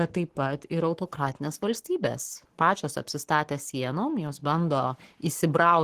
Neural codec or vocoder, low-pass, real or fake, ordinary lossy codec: codec, 44.1 kHz, 7.8 kbps, Pupu-Codec; 14.4 kHz; fake; Opus, 16 kbps